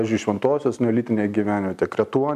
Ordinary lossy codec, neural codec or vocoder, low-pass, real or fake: MP3, 96 kbps; none; 14.4 kHz; real